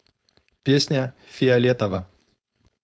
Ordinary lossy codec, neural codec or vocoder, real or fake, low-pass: none; codec, 16 kHz, 4.8 kbps, FACodec; fake; none